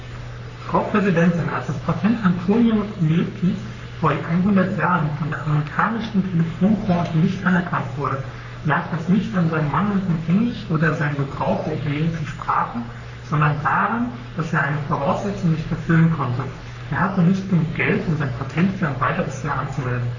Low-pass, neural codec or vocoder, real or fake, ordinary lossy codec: 7.2 kHz; codec, 44.1 kHz, 3.4 kbps, Pupu-Codec; fake; none